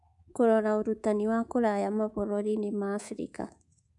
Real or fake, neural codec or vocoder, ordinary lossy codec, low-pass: fake; codec, 24 kHz, 3.1 kbps, DualCodec; none; 10.8 kHz